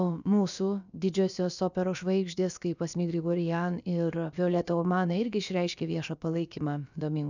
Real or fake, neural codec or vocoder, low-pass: fake; codec, 16 kHz, about 1 kbps, DyCAST, with the encoder's durations; 7.2 kHz